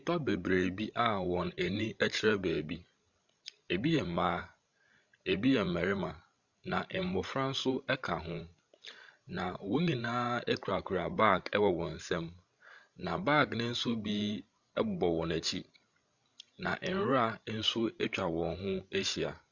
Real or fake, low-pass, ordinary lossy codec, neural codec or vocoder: fake; 7.2 kHz; Opus, 64 kbps; codec, 16 kHz, 16 kbps, FreqCodec, larger model